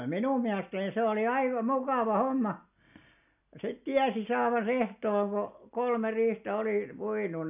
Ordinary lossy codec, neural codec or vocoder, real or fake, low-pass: none; none; real; 3.6 kHz